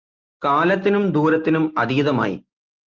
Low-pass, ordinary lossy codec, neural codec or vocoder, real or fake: 7.2 kHz; Opus, 24 kbps; none; real